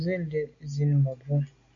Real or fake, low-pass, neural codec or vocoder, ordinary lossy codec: real; 7.2 kHz; none; AAC, 48 kbps